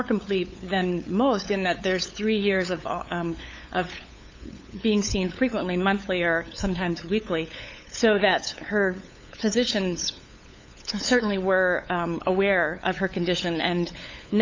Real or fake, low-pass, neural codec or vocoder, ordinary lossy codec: fake; 7.2 kHz; codec, 16 kHz, 8 kbps, FunCodec, trained on LibriTTS, 25 frames a second; MP3, 48 kbps